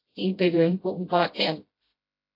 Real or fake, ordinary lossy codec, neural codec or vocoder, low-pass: fake; AAC, 32 kbps; codec, 16 kHz, 0.5 kbps, FreqCodec, smaller model; 5.4 kHz